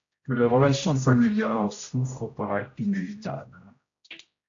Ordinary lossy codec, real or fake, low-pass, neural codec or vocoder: AAC, 64 kbps; fake; 7.2 kHz; codec, 16 kHz, 0.5 kbps, X-Codec, HuBERT features, trained on general audio